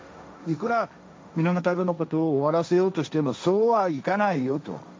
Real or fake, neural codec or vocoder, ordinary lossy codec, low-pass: fake; codec, 16 kHz, 1.1 kbps, Voila-Tokenizer; none; none